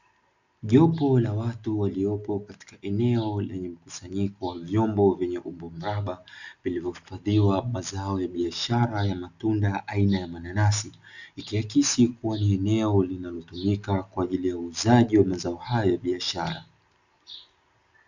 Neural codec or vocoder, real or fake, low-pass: none; real; 7.2 kHz